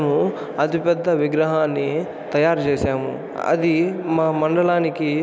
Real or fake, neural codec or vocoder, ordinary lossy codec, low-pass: real; none; none; none